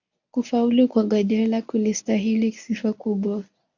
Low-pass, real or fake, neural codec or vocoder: 7.2 kHz; fake; codec, 24 kHz, 0.9 kbps, WavTokenizer, medium speech release version 1